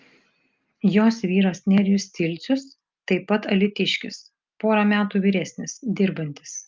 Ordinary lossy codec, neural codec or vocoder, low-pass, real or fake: Opus, 32 kbps; none; 7.2 kHz; real